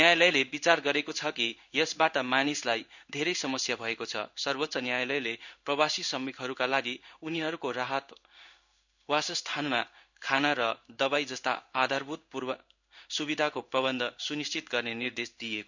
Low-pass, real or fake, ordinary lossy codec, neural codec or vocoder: 7.2 kHz; fake; none; codec, 16 kHz in and 24 kHz out, 1 kbps, XY-Tokenizer